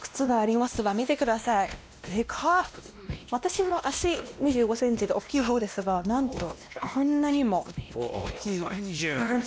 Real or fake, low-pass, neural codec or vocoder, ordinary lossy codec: fake; none; codec, 16 kHz, 1 kbps, X-Codec, WavLM features, trained on Multilingual LibriSpeech; none